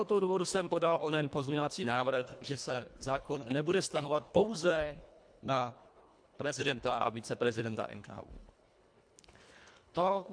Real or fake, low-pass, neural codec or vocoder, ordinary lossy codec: fake; 9.9 kHz; codec, 24 kHz, 1.5 kbps, HILCodec; AAC, 64 kbps